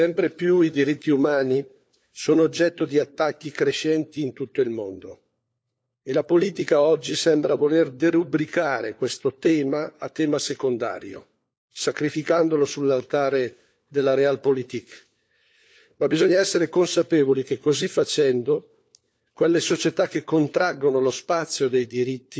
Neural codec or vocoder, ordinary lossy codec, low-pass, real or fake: codec, 16 kHz, 4 kbps, FunCodec, trained on LibriTTS, 50 frames a second; none; none; fake